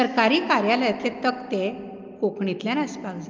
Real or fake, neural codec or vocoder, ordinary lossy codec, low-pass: real; none; Opus, 32 kbps; 7.2 kHz